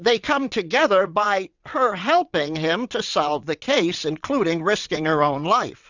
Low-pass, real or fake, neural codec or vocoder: 7.2 kHz; fake; vocoder, 22.05 kHz, 80 mel bands, WaveNeXt